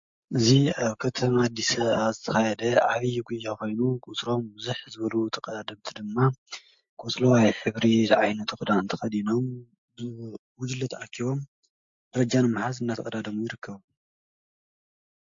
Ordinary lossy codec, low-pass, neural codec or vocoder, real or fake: MP3, 48 kbps; 7.2 kHz; none; real